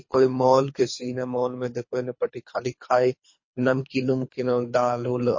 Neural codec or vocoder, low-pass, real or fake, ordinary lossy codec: codec, 24 kHz, 3 kbps, HILCodec; 7.2 kHz; fake; MP3, 32 kbps